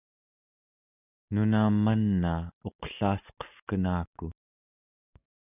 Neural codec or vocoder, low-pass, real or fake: none; 3.6 kHz; real